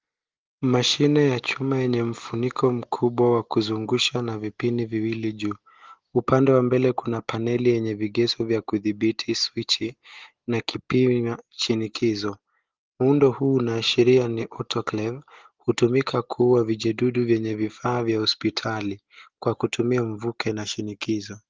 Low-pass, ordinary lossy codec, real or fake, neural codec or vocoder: 7.2 kHz; Opus, 16 kbps; real; none